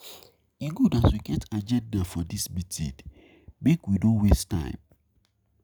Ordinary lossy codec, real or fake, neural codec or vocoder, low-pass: none; real; none; none